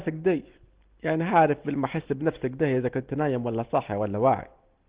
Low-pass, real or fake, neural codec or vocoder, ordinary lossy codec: 3.6 kHz; real; none; Opus, 16 kbps